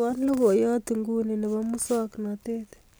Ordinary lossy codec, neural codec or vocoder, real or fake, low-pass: none; none; real; none